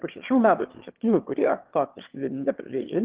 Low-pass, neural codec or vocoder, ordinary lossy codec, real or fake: 3.6 kHz; autoencoder, 22.05 kHz, a latent of 192 numbers a frame, VITS, trained on one speaker; Opus, 16 kbps; fake